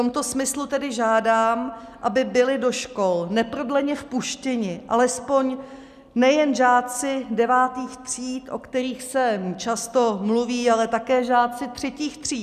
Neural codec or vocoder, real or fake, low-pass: none; real; 14.4 kHz